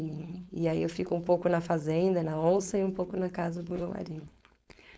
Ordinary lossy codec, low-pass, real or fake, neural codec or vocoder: none; none; fake; codec, 16 kHz, 4.8 kbps, FACodec